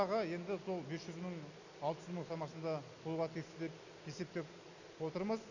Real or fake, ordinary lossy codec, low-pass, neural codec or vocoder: real; none; 7.2 kHz; none